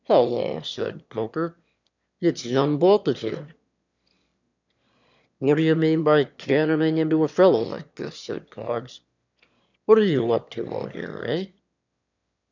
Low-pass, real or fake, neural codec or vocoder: 7.2 kHz; fake; autoencoder, 22.05 kHz, a latent of 192 numbers a frame, VITS, trained on one speaker